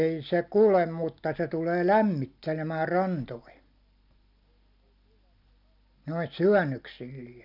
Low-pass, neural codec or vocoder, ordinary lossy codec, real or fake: 5.4 kHz; none; none; real